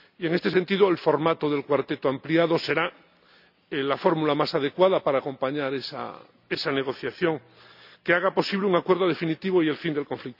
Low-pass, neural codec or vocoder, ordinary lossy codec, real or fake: 5.4 kHz; none; none; real